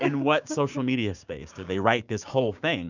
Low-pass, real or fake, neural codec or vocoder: 7.2 kHz; fake; codec, 16 kHz, 6 kbps, DAC